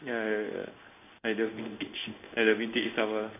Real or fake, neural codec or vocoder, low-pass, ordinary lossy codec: fake; codec, 16 kHz in and 24 kHz out, 1 kbps, XY-Tokenizer; 3.6 kHz; none